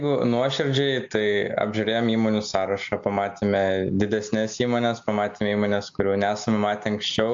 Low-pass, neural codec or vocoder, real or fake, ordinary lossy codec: 7.2 kHz; none; real; AAC, 64 kbps